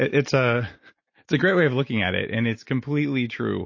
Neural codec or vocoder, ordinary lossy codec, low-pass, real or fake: none; MP3, 32 kbps; 7.2 kHz; real